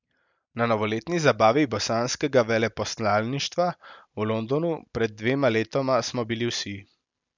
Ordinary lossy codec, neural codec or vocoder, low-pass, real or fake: none; none; 7.2 kHz; real